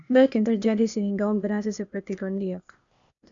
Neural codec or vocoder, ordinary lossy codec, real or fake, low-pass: codec, 16 kHz, 0.8 kbps, ZipCodec; none; fake; 7.2 kHz